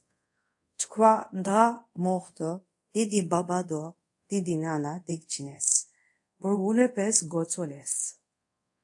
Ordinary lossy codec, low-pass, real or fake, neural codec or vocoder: AAC, 64 kbps; 10.8 kHz; fake; codec, 24 kHz, 0.5 kbps, DualCodec